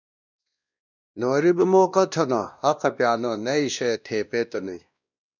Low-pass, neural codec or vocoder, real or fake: 7.2 kHz; codec, 16 kHz, 1 kbps, X-Codec, WavLM features, trained on Multilingual LibriSpeech; fake